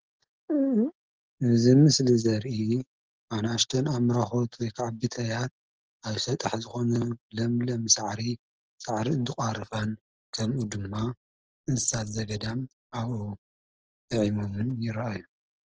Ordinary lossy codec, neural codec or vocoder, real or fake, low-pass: Opus, 16 kbps; none; real; 7.2 kHz